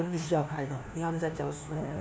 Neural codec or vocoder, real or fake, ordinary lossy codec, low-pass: codec, 16 kHz, 1 kbps, FunCodec, trained on LibriTTS, 50 frames a second; fake; none; none